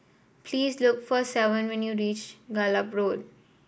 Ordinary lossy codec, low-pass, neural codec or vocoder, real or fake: none; none; none; real